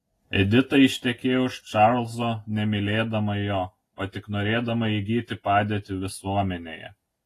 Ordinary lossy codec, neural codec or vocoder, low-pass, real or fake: AAC, 48 kbps; none; 14.4 kHz; real